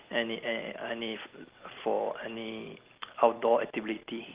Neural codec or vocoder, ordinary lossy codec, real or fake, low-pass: none; Opus, 32 kbps; real; 3.6 kHz